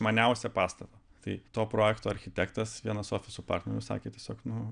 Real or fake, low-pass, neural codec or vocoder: real; 9.9 kHz; none